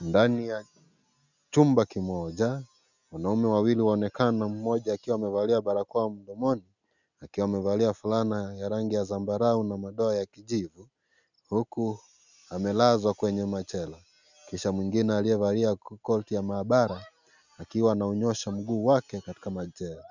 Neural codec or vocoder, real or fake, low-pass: none; real; 7.2 kHz